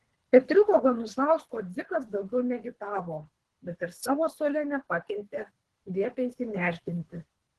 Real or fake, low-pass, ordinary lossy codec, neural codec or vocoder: fake; 10.8 kHz; Opus, 16 kbps; codec, 24 kHz, 3 kbps, HILCodec